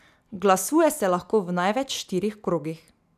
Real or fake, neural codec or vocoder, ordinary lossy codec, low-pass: real; none; none; 14.4 kHz